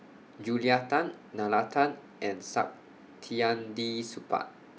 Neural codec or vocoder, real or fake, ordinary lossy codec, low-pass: none; real; none; none